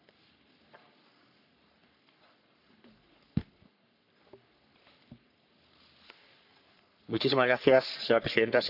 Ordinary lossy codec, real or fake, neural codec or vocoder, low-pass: none; fake; codec, 44.1 kHz, 3.4 kbps, Pupu-Codec; 5.4 kHz